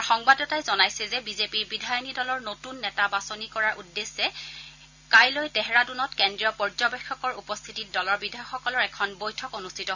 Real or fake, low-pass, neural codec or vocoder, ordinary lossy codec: real; 7.2 kHz; none; none